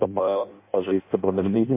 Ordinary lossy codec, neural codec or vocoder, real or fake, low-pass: MP3, 24 kbps; codec, 16 kHz in and 24 kHz out, 0.6 kbps, FireRedTTS-2 codec; fake; 3.6 kHz